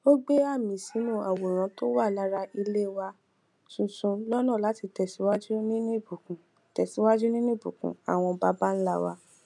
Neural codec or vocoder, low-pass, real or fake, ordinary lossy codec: none; none; real; none